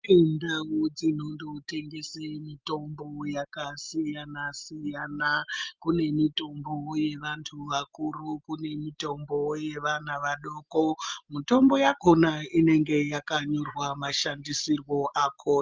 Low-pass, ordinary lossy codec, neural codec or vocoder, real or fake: 7.2 kHz; Opus, 24 kbps; none; real